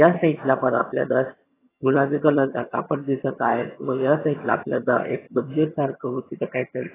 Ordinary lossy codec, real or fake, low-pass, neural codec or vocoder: AAC, 16 kbps; fake; 3.6 kHz; vocoder, 22.05 kHz, 80 mel bands, HiFi-GAN